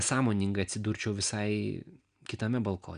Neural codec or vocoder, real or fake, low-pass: none; real; 9.9 kHz